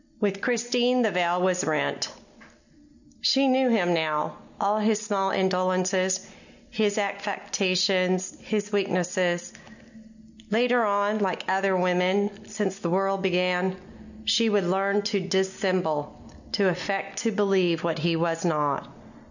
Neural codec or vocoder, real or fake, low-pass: none; real; 7.2 kHz